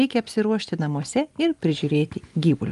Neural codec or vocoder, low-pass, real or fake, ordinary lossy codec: none; 10.8 kHz; real; Opus, 32 kbps